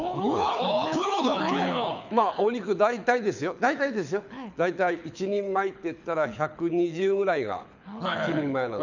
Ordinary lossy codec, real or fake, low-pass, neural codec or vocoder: none; fake; 7.2 kHz; codec, 24 kHz, 6 kbps, HILCodec